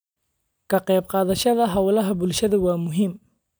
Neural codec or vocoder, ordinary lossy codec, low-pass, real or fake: none; none; none; real